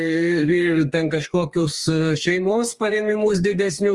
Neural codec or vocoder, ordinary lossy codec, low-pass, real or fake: codec, 32 kHz, 1.9 kbps, SNAC; Opus, 24 kbps; 10.8 kHz; fake